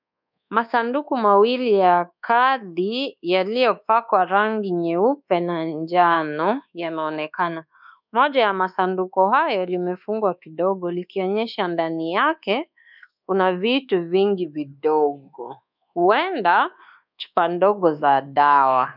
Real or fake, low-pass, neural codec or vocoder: fake; 5.4 kHz; codec, 24 kHz, 1.2 kbps, DualCodec